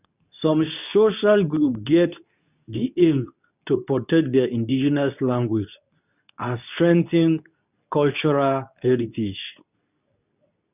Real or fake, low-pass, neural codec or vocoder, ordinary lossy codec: fake; 3.6 kHz; codec, 24 kHz, 0.9 kbps, WavTokenizer, medium speech release version 2; none